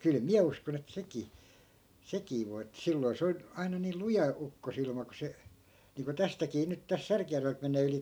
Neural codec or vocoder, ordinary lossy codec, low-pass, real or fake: none; none; none; real